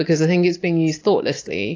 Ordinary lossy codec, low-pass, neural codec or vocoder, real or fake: AAC, 32 kbps; 7.2 kHz; none; real